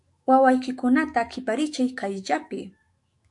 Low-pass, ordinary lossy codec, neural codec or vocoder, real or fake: 10.8 kHz; MP3, 64 kbps; autoencoder, 48 kHz, 128 numbers a frame, DAC-VAE, trained on Japanese speech; fake